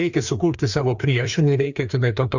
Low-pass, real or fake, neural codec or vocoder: 7.2 kHz; fake; codec, 16 kHz, 2 kbps, FreqCodec, larger model